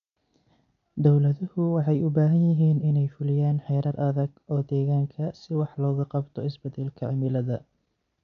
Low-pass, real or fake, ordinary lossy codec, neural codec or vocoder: 7.2 kHz; real; none; none